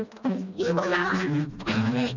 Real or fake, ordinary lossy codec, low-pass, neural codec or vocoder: fake; none; 7.2 kHz; codec, 16 kHz, 1 kbps, FreqCodec, smaller model